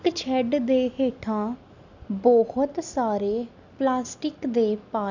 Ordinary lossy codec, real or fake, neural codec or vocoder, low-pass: none; real; none; 7.2 kHz